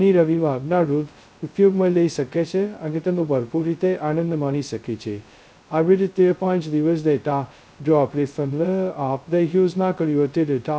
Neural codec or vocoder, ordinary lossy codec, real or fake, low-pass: codec, 16 kHz, 0.2 kbps, FocalCodec; none; fake; none